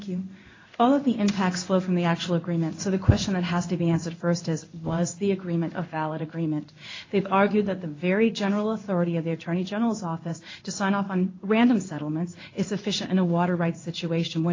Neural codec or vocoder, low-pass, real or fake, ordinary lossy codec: codec, 16 kHz in and 24 kHz out, 1 kbps, XY-Tokenizer; 7.2 kHz; fake; AAC, 32 kbps